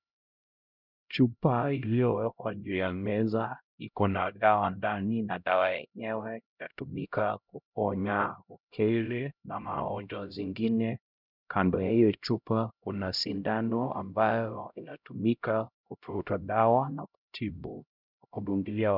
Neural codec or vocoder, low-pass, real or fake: codec, 16 kHz, 0.5 kbps, X-Codec, HuBERT features, trained on LibriSpeech; 5.4 kHz; fake